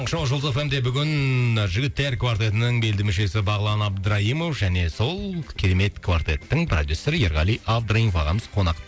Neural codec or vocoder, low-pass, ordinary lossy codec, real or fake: none; none; none; real